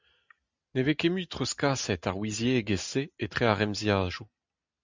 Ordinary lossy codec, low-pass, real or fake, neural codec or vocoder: MP3, 64 kbps; 7.2 kHz; real; none